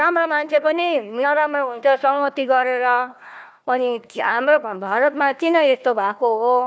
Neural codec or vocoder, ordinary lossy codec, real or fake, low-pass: codec, 16 kHz, 1 kbps, FunCodec, trained on Chinese and English, 50 frames a second; none; fake; none